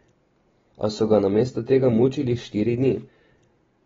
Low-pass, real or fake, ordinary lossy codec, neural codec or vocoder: 7.2 kHz; real; AAC, 24 kbps; none